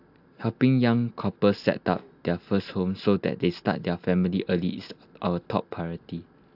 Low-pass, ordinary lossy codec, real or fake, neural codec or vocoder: 5.4 kHz; AAC, 48 kbps; real; none